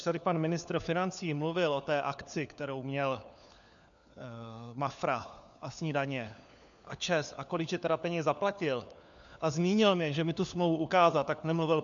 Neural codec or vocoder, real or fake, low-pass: codec, 16 kHz, 4 kbps, FunCodec, trained on LibriTTS, 50 frames a second; fake; 7.2 kHz